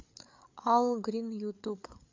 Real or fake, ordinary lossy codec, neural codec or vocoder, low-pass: fake; MP3, 64 kbps; codec, 16 kHz, 8 kbps, FreqCodec, larger model; 7.2 kHz